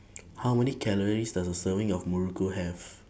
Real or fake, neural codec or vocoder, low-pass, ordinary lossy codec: real; none; none; none